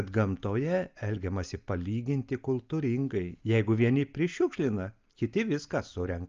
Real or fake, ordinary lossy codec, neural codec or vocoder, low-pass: real; Opus, 24 kbps; none; 7.2 kHz